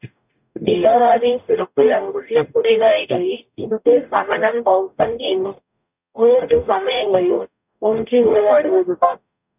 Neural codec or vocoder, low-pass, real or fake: codec, 44.1 kHz, 0.9 kbps, DAC; 3.6 kHz; fake